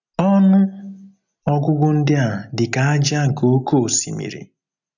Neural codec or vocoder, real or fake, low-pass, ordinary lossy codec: none; real; 7.2 kHz; none